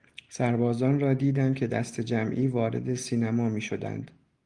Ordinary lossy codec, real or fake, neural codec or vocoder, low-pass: Opus, 24 kbps; real; none; 10.8 kHz